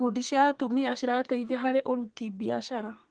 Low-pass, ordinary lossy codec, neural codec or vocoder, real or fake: 9.9 kHz; Opus, 24 kbps; codec, 32 kHz, 1.9 kbps, SNAC; fake